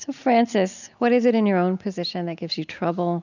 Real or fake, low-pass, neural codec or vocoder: real; 7.2 kHz; none